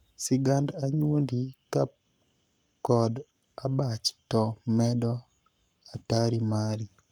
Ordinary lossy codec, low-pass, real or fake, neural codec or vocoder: none; 19.8 kHz; fake; codec, 44.1 kHz, 7.8 kbps, Pupu-Codec